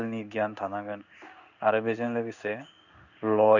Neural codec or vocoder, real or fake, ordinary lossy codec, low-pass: codec, 16 kHz in and 24 kHz out, 1 kbps, XY-Tokenizer; fake; none; 7.2 kHz